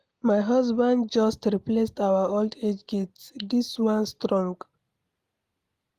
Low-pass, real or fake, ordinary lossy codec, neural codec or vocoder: 14.4 kHz; real; Opus, 24 kbps; none